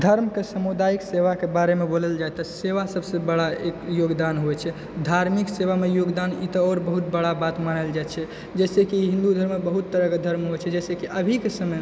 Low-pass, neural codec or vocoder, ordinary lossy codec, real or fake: none; none; none; real